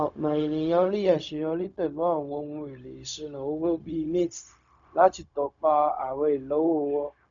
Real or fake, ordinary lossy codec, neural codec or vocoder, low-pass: fake; none; codec, 16 kHz, 0.4 kbps, LongCat-Audio-Codec; 7.2 kHz